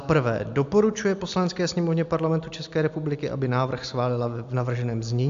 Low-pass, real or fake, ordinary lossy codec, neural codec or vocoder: 7.2 kHz; real; AAC, 64 kbps; none